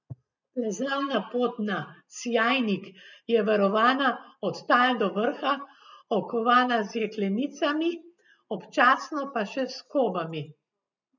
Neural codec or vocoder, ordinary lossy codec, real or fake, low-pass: none; none; real; 7.2 kHz